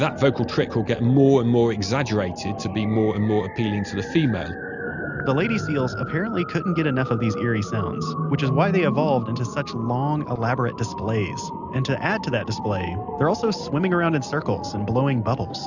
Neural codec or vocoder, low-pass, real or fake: none; 7.2 kHz; real